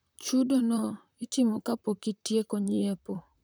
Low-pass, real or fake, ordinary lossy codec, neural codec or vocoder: none; fake; none; vocoder, 44.1 kHz, 128 mel bands, Pupu-Vocoder